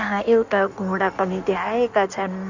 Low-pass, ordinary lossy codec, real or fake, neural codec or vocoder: 7.2 kHz; none; fake; codec, 16 kHz in and 24 kHz out, 1.1 kbps, FireRedTTS-2 codec